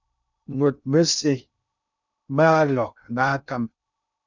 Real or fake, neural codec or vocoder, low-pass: fake; codec, 16 kHz in and 24 kHz out, 0.6 kbps, FocalCodec, streaming, 2048 codes; 7.2 kHz